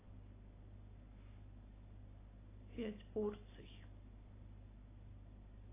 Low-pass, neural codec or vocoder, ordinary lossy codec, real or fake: 3.6 kHz; none; AAC, 16 kbps; real